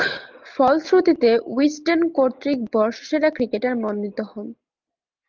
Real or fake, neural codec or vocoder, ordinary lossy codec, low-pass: real; none; Opus, 24 kbps; 7.2 kHz